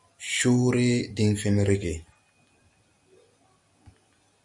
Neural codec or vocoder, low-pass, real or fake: none; 10.8 kHz; real